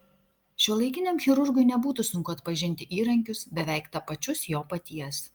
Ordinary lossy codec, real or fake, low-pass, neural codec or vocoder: Opus, 32 kbps; real; 19.8 kHz; none